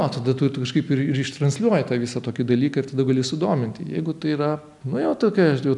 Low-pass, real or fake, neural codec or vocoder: 10.8 kHz; real; none